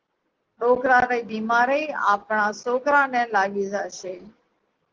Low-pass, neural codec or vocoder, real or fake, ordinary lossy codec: 7.2 kHz; none; real; Opus, 16 kbps